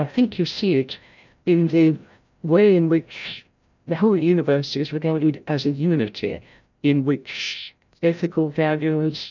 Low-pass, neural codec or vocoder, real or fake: 7.2 kHz; codec, 16 kHz, 0.5 kbps, FreqCodec, larger model; fake